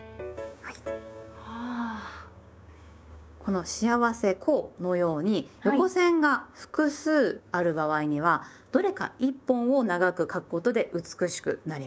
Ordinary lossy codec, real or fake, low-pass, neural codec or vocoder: none; fake; none; codec, 16 kHz, 6 kbps, DAC